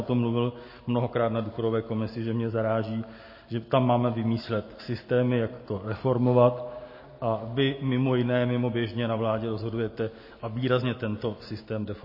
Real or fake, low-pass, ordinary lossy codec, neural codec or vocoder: real; 5.4 kHz; MP3, 24 kbps; none